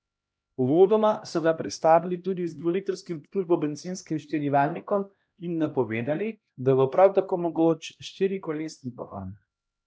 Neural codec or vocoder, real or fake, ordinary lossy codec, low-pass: codec, 16 kHz, 1 kbps, X-Codec, HuBERT features, trained on LibriSpeech; fake; none; none